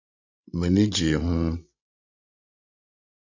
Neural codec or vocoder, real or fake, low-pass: codec, 16 kHz, 16 kbps, FreqCodec, larger model; fake; 7.2 kHz